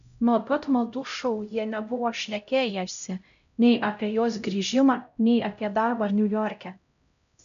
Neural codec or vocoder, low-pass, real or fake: codec, 16 kHz, 0.5 kbps, X-Codec, HuBERT features, trained on LibriSpeech; 7.2 kHz; fake